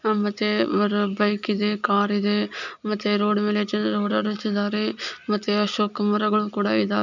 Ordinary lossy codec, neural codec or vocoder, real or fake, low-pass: none; none; real; 7.2 kHz